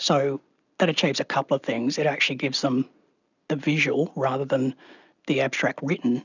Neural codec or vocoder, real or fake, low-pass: none; real; 7.2 kHz